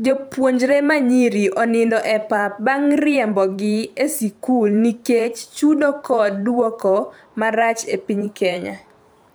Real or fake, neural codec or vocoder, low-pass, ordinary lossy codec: fake; vocoder, 44.1 kHz, 128 mel bands every 512 samples, BigVGAN v2; none; none